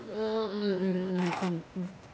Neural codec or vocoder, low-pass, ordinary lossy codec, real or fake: codec, 16 kHz, 0.8 kbps, ZipCodec; none; none; fake